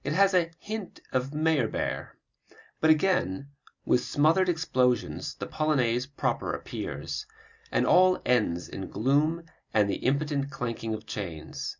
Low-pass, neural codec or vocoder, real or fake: 7.2 kHz; none; real